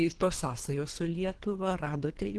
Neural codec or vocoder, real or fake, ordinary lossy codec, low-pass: codec, 24 kHz, 3 kbps, HILCodec; fake; Opus, 16 kbps; 10.8 kHz